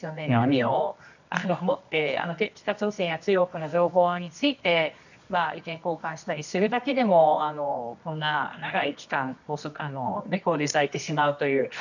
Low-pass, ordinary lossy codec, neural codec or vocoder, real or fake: 7.2 kHz; none; codec, 24 kHz, 0.9 kbps, WavTokenizer, medium music audio release; fake